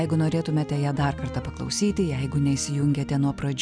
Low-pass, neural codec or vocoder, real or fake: 9.9 kHz; none; real